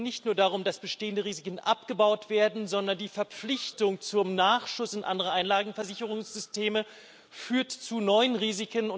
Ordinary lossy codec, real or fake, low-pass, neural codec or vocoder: none; real; none; none